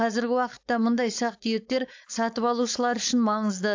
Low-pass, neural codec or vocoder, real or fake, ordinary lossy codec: 7.2 kHz; codec, 16 kHz, 4.8 kbps, FACodec; fake; AAC, 48 kbps